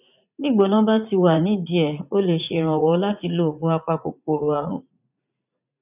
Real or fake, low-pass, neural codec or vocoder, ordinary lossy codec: fake; 3.6 kHz; vocoder, 44.1 kHz, 80 mel bands, Vocos; none